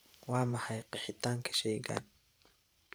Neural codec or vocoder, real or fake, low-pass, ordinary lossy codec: vocoder, 44.1 kHz, 128 mel bands every 512 samples, BigVGAN v2; fake; none; none